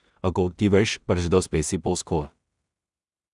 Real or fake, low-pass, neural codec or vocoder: fake; 10.8 kHz; codec, 16 kHz in and 24 kHz out, 0.4 kbps, LongCat-Audio-Codec, two codebook decoder